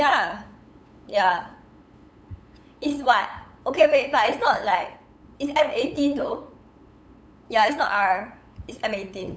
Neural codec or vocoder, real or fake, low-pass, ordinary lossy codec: codec, 16 kHz, 8 kbps, FunCodec, trained on LibriTTS, 25 frames a second; fake; none; none